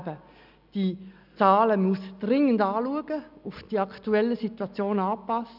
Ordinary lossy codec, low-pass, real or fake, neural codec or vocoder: none; 5.4 kHz; real; none